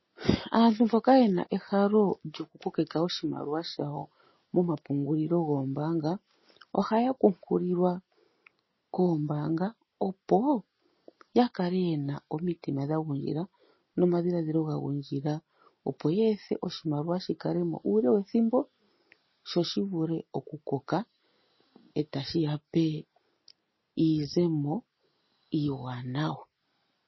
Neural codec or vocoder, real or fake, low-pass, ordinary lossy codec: none; real; 7.2 kHz; MP3, 24 kbps